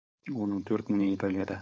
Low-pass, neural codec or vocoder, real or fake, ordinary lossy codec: none; codec, 16 kHz, 4.8 kbps, FACodec; fake; none